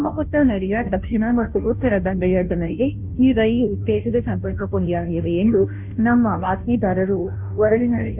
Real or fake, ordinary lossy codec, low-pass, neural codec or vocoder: fake; none; 3.6 kHz; codec, 16 kHz, 0.5 kbps, FunCodec, trained on Chinese and English, 25 frames a second